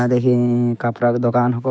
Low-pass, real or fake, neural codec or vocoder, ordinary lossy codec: none; fake; codec, 16 kHz, 6 kbps, DAC; none